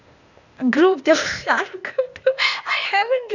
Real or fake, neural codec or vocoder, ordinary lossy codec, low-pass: fake; codec, 16 kHz, 0.8 kbps, ZipCodec; none; 7.2 kHz